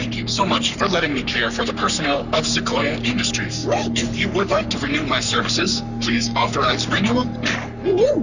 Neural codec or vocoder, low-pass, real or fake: codec, 44.1 kHz, 3.4 kbps, Pupu-Codec; 7.2 kHz; fake